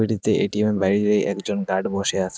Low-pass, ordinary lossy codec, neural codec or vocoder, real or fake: none; none; none; real